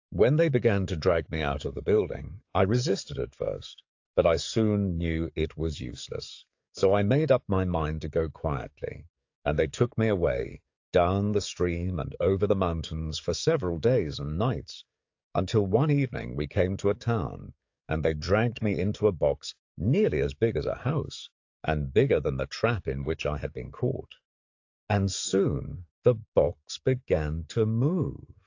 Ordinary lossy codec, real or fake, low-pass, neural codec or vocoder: AAC, 48 kbps; fake; 7.2 kHz; codec, 44.1 kHz, 7.8 kbps, DAC